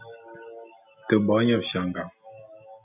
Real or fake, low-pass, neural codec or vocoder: real; 3.6 kHz; none